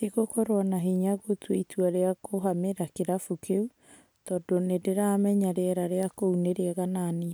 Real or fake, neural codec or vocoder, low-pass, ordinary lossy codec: real; none; none; none